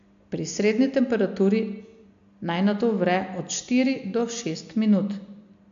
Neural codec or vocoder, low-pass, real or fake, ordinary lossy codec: none; 7.2 kHz; real; AAC, 64 kbps